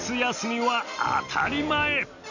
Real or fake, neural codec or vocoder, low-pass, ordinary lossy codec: real; none; 7.2 kHz; none